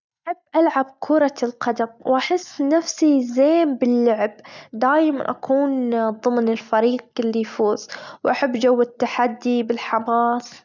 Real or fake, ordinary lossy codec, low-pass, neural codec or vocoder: real; none; 7.2 kHz; none